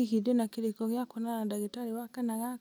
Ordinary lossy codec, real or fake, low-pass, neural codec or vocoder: none; real; none; none